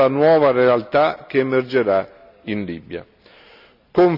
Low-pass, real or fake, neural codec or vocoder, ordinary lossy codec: 5.4 kHz; real; none; none